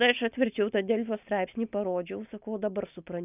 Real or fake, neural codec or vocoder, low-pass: real; none; 3.6 kHz